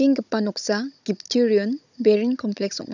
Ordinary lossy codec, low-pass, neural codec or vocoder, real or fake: none; 7.2 kHz; codec, 16 kHz, 16 kbps, FunCodec, trained on Chinese and English, 50 frames a second; fake